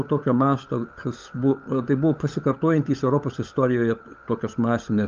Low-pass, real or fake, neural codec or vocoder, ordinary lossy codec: 7.2 kHz; fake; codec, 16 kHz, 16 kbps, FunCodec, trained on LibriTTS, 50 frames a second; Opus, 24 kbps